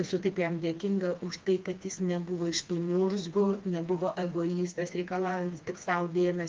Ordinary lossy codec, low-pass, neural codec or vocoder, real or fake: Opus, 24 kbps; 7.2 kHz; codec, 16 kHz, 2 kbps, FreqCodec, smaller model; fake